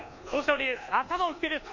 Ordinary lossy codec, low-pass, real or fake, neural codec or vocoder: none; 7.2 kHz; fake; codec, 24 kHz, 1.2 kbps, DualCodec